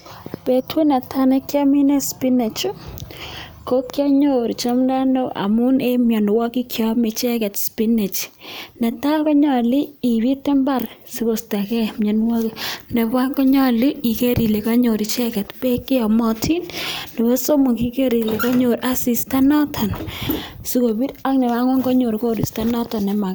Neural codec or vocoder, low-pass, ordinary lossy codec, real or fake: none; none; none; real